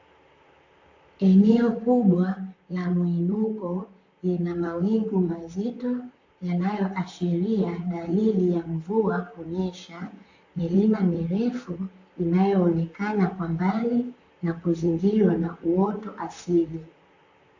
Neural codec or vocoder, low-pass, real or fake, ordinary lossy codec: vocoder, 44.1 kHz, 128 mel bands, Pupu-Vocoder; 7.2 kHz; fake; AAC, 48 kbps